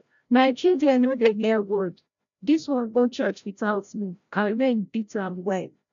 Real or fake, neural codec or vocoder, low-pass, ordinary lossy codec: fake; codec, 16 kHz, 0.5 kbps, FreqCodec, larger model; 7.2 kHz; none